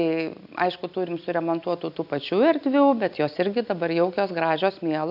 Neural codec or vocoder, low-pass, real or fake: none; 5.4 kHz; real